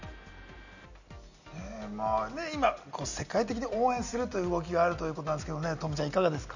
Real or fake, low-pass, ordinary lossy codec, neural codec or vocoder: real; 7.2 kHz; none; none